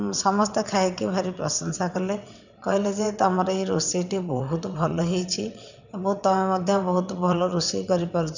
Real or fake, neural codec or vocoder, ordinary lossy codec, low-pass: fake; vocoder, 22.05 kHz, 80 mel bands, WaveNeXt; none; 7.2 kHz